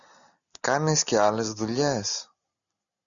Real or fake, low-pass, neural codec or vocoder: real; 7.2 kHz; none